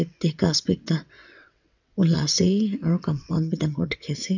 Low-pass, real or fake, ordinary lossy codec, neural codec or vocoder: 7.2 kHz; fake; none; vocoder, 22.05 kHz, 80 mel bands, WaveNeXt